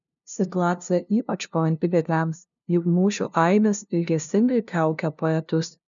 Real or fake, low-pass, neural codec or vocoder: fake; 7.2 kHz; codec, 16 kHz, 0.5 kbps, FunCodec, trained on LibriTTS, 25 frames a second